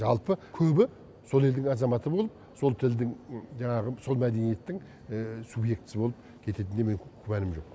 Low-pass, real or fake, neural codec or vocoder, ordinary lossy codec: none; real; none; none